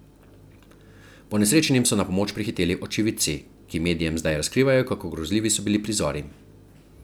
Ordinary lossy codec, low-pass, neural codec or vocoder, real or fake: none; none; none; real